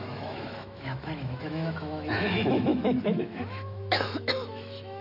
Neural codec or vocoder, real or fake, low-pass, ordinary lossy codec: autoencoder, 48 kHz, 128 numbers a frame, DAC-VAE, trained on Japanese speech; fake; 5.4 kHz; none